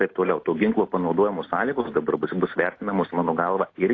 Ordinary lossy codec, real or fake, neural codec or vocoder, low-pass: AAC, 32 kbps; real; none; 7.2 kHz